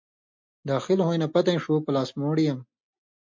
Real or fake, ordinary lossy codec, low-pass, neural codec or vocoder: real; MP3, 48 kbps; 7.2 kHz; none